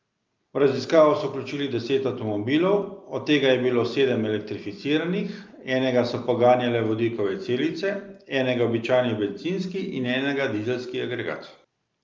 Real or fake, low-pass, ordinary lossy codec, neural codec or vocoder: real; 7.2 kHz; Opus, 24 kbps; none